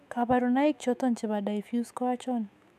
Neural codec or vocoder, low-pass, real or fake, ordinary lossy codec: autoencoder, 48 kHz, 128 numbers a frame, DAC-VAE, trained on Japanese speech; 14.4 kHz; fake; none